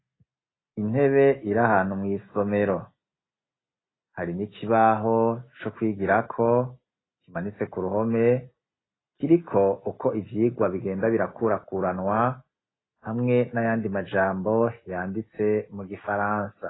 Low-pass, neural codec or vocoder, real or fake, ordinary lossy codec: 7.2 kHz; none; real; AAC, 16 kbps